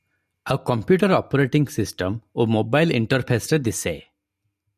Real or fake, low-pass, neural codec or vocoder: real; 14.4 kHz; none